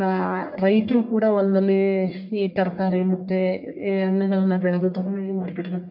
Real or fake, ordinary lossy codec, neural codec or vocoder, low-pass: fake; MP3, 48 kbps; codec, 44.1 kHz, 1.7 kbps, Pupu-Codec; 5.4 kHz